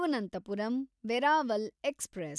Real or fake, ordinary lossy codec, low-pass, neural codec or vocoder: real; none; none; none